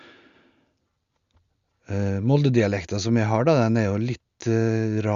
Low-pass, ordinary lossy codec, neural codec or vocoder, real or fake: 7.2 kHz; Opus, 64 kbps; none; real